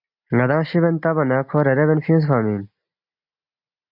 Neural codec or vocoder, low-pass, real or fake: none; 5.4 kHz; real